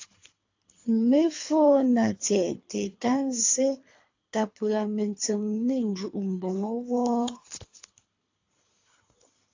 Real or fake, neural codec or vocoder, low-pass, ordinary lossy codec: fake; codec, 24 kHz, 3 kbps, HILCodec; 7.2 kHz; AAC, 48 kbps